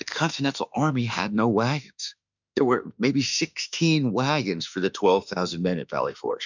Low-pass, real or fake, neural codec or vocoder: 7.2 kHz; fake; autoencoder, 48 kHz, 32 numbers a frame, DAC-VAE, trained on Japanese speech